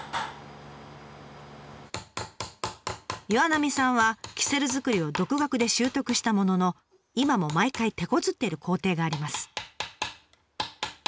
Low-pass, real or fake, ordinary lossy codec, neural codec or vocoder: none; real; none; none